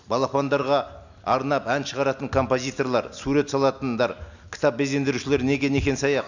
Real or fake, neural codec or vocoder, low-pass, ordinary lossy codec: real; none; 7.2 kHz; none